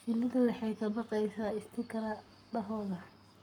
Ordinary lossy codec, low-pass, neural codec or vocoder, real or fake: none; 19.8 kHz; codec, 44.1 kHz, 7.8 kbps, Pupu-Codec; fake